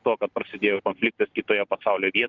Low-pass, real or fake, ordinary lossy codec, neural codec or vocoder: 7.2 kHz; real; Opus, 24 kbps; none